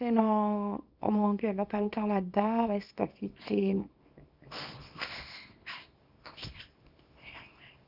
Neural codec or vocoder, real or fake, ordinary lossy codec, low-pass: codec, 24 kHz, 0.9 kbps, WavTokenizer, small release; fake; none; 5.4 kHz